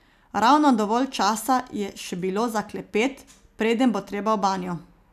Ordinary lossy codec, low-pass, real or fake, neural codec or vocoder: none; 14.4 kHz; real; none